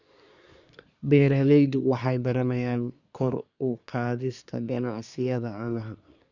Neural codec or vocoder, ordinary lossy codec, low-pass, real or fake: codec, 24 kHz, 1 kbps, SNAC; none; 7.2 kHz; fake